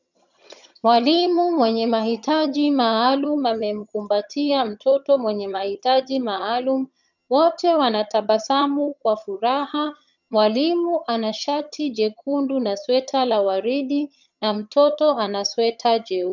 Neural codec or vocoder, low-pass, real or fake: vocoder, 22.05 kHz, 80 mel bands, HiFi-GAN; 7.2 kHz; fake